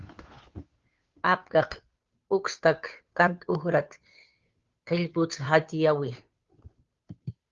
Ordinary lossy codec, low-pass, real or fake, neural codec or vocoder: Opus, 32 kbps; 7.2 kHz; fake; codec, 16 kHz, 2 kbps, FunCodec, trained on Chinese and English, 25 frames a second